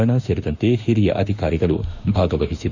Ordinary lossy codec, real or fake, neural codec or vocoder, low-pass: none; fake; autoencoder, 48 kHz, 32 numbers a frame, DAC-VAE, trained on Japanese speech; 7.2 kHz